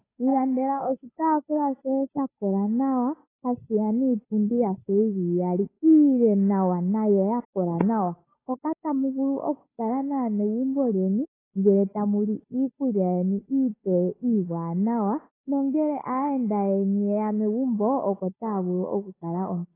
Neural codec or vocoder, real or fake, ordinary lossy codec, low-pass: codec, 16 kHz, 8 kbps, FunCodec, trained on Chinese and English, 25 frames a second; fake; AAC, 16 kbps; 3.6 kHz